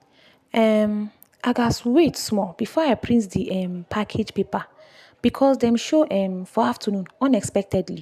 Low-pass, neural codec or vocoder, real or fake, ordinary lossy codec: 14.4 kHz; none; real; none